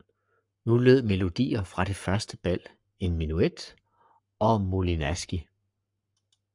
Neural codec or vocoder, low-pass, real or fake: codec, 44.1 kHz, 7.8 kbps, Pupu-Codec; 10.8 kHz; fake